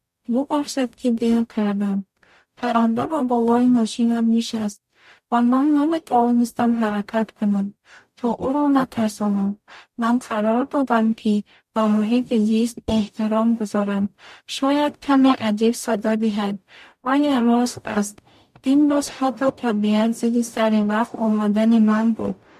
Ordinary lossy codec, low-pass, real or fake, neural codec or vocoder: MP3, 64 kbps; 14.4 kHz; fake; codec, 44.1 kHz, 0.9 kbps, DAC